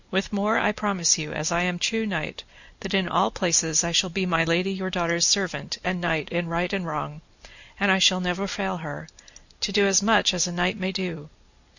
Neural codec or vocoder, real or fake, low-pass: none; real; 7.2 kHz